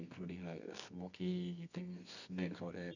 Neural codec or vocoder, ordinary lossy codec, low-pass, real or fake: codec, 24 kHz, 0.9 kbps, WavTokenizer, medium music audio release; none; 7.2 kHz; fake